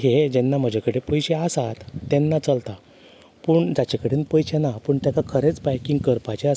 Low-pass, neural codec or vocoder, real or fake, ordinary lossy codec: none; none; real; none